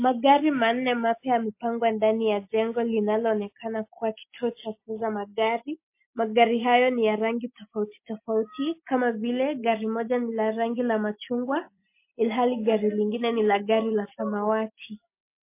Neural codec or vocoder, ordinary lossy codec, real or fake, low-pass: none; MP3, 24 kbps; real; 3.6 kHz